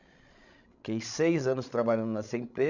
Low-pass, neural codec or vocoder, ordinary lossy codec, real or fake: 7.2 kHz; codec, 16 kHz, 16 kbps, FreqCodec, larger model; none; fake